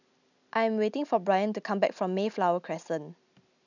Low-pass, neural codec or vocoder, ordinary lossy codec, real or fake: 7.2 kHz; none; none; real